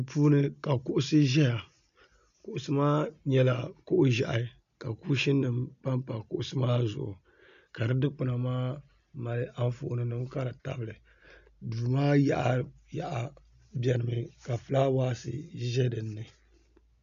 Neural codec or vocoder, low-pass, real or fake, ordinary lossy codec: none; 7.2 kHz; real; AAC, 96 kbps